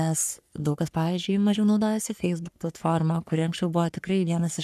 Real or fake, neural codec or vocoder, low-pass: fake; codec, 44.1 kHz, 3.4 kbps, Pupu-Codec; 14.4 kHz